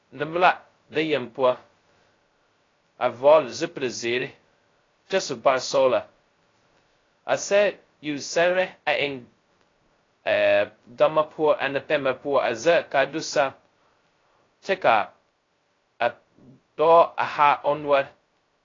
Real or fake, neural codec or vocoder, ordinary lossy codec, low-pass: fake; codec, 16 kHz, 0.2 kbps, FocalCodec; AAC, 32 kbps; 7.2 kHz